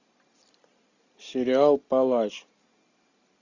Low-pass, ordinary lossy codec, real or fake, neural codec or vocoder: 7.2 kHz; AAC, 48 kbps; real; none